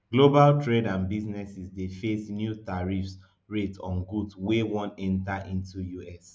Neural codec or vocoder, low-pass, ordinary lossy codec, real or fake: none; none; none; real